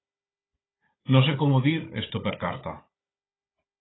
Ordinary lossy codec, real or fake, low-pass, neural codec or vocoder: AAC, 16 kbps; fake; 7.2 kHz; codec, 16 kHz, 16 kbps, FunCodec, trained on Chinese and English, 50 frames a second